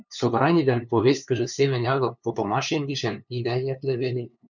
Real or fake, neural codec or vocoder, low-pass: fake; codec, 16 kHz, 2 kbps, FunCodec, trained on LibriTTS, 25 frames a second; 7.2 kHz